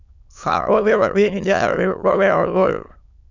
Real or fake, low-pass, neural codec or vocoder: fake; 7.2 kHz; autoencoder, 22.05 kHz, a latent of 192 numbers a frame, VITS, trained on many speakers